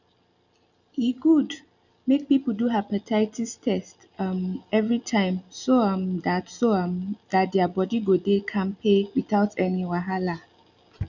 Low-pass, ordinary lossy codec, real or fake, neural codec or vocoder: 7.2 kHz; none; real; none